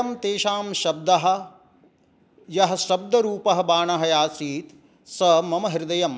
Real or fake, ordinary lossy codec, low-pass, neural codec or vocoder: real; none; none; none